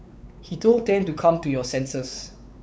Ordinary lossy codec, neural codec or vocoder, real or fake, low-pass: none; codec, 16 kHz, 4 kbps, X-Codec, WavLM features, trained on Multilingual LibriSpeech; fake; none